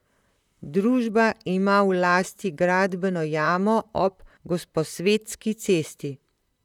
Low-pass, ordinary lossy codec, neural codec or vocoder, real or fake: 19.8 kHz; none; vocoder, 44.1 kHz, 128 mel bands, Pupu-Vocoder; fake